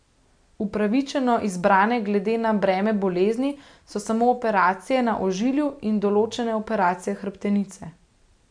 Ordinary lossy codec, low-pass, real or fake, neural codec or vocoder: MP3, 64 kbps; 9.9 kHz; real; none